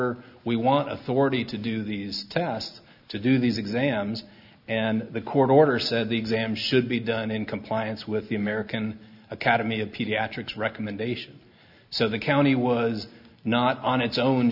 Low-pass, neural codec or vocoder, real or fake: 5.4 kHz; none; real